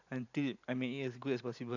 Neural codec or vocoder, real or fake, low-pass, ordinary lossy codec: vocoder, 44.1 kHz, 128 mel bands every 512 samples, BigVGAN v2; fake; 7.2 kHz; none